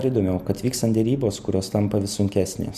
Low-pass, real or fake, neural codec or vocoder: 14.4 kHz; real; none